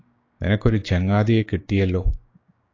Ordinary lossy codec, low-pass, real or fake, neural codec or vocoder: AAC, 32 kbps; 7.2 kHz; fake; codec, 16 kHz, 6 kbps, DAC